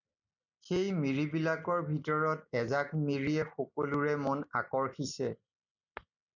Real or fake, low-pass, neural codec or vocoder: real; 7.2 kHz; none